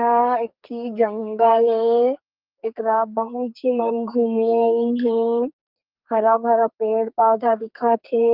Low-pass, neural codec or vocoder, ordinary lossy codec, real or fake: 5.4 kHz; codec, 44.1 kHz, 2.6 kbps, SNAC; Opus, 24 kbps; fake